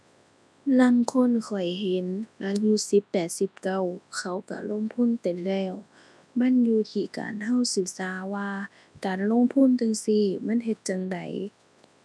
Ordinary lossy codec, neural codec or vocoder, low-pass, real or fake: none; codec, 24 kHz, 0.9 kbps, WavTokenizer, large speech release; none; fake